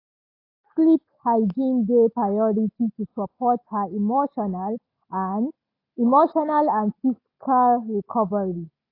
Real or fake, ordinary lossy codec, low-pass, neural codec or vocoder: real; AAC, 32 kbps; 5.4 kHz; none